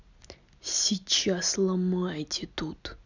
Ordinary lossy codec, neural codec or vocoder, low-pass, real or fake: none; none; 7.2 kHz; real